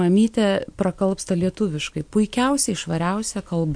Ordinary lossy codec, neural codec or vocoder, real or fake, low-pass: MP3, 64 kbps; none; real; 9.9 kHz